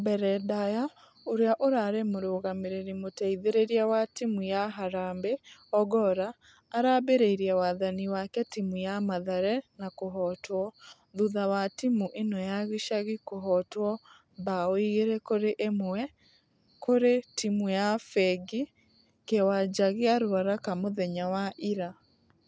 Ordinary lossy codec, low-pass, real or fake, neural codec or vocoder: none; none; real; none